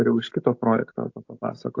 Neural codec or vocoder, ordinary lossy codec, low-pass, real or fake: none; AAC, 48 kbps; 7.2 kHz; real